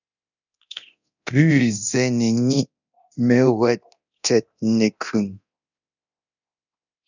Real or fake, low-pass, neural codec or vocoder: fake; 7.2 kHz; codec, 24 kHz, 0.9 kbps, DualCodec